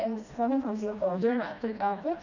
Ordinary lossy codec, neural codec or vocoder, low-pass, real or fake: none; codec, 16 kHz, 1 kbps, FreqCodec, smaller model; 7.2 kHz; fake